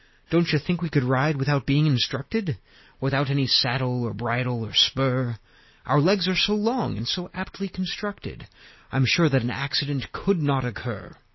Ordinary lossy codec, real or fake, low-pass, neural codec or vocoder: MP3, 24 kbps; real; 7.2 kHz; none